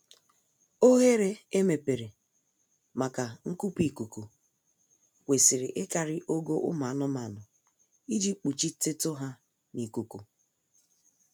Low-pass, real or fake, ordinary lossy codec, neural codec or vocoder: none; real; none; none